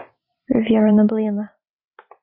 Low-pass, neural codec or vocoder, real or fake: 5.4 kHz; vocoder, 44.1 kHz, 128 mel bands every 256 samples, BigVGAN v2; fake